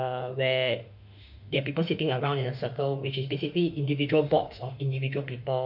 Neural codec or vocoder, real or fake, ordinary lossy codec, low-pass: autoencoder, 48 kHz, 32 numbers a frame, DAC-VAE, trained on Japanese speech; fake; none; 5.4 kHz